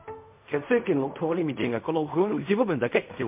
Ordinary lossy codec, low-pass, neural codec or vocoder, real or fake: MP3, 32 kbps; 3.6 kHz; codec, 16 kHz in and 24 kHz out, 0.4 kbps, LongCat-Audio-Codec, fine tuned four codebook decoder; fake